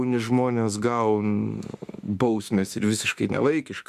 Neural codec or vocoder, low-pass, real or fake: autoencoder, 48 kHz, 32 numbers a frame, DAC-VAE, trained on Japanese speech; 14.4 kHz; fake